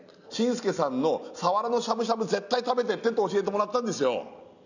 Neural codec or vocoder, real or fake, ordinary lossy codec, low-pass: none; real; none; 7.2 kHz